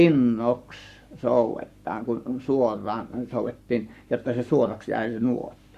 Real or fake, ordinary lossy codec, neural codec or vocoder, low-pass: fake; Opus, 64 kbps; codec, 44.1 kHz, 7.8 kbps, Pupu-Codec; 14.4 kHz